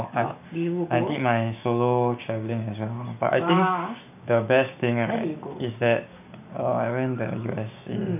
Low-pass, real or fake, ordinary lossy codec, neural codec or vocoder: 3.6 kHz; fake; none; codec, 16 kHz, 6 kbps, DAC